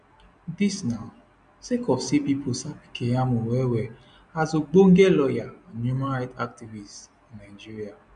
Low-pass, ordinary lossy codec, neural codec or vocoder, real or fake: 9.9 kHz; none; none; real